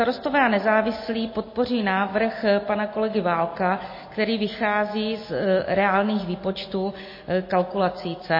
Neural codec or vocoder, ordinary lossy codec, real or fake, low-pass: none; MP3, 24 kbps; real; 5.4 kHz